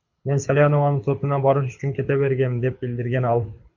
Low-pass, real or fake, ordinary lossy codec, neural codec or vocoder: 7.2 kHz; fake; MP3, 48 kbps; codec, 24 kHz, 6 kbps, HILCodec